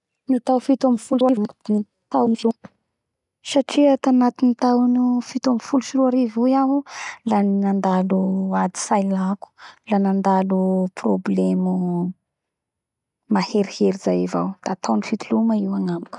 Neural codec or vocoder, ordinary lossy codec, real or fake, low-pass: none; none; real; 10.8 kHz